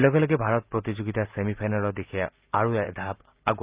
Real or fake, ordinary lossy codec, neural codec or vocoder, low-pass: real; Opus, 24 kbps; none; 3.6 kHz